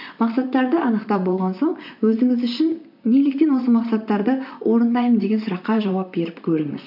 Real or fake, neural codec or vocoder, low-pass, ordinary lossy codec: fake; vocoder, 44.1 kHz, 128 mel bands, Pupu-Vocoder; 5.4 kHz; MP3, 48 kbps